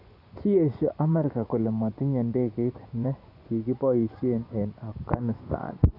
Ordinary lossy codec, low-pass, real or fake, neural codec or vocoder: none; 5.4 kHz; fake; vocoder, 24 kHz, 100 mel bands, Vocos